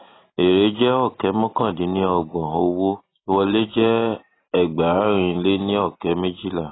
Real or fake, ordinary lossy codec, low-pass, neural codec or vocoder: real; AAC, 16 kbps; 7.2 kHz; none